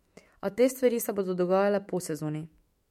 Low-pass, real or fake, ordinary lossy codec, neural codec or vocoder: 19.8 kHz; fake; MP3, 64 kbps; autoencoder, 48 kHz, 128 numbers a frame, DAC-VAE, trained on Japanese speech